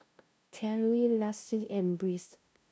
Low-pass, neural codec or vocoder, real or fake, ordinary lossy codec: none; codec, 16 kHz, 0.5 kbps, FunCodec, trained on LibriTTS, 25 frames a second; fake; none